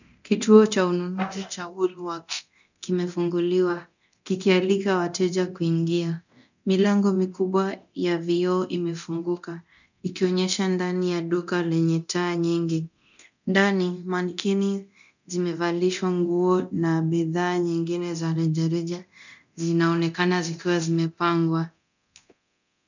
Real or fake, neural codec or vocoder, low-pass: fake; codec, 24 kHz, 0.9 kbps, DualCodec; 7.2 kHz